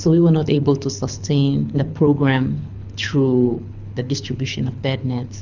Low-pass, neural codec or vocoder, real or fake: 7.2 kHz; codec, 24 kHz, 6 kbps, HILCodec; fake